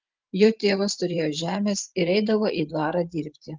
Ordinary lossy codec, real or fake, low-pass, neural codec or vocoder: Opus, 32 kbps; fake; 7.2 kHz; vocoder, 44.1 kHz, 128 mel bands every 512 samples, BigVGAN v2